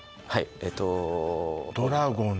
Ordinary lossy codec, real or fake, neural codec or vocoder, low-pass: none; real; none; none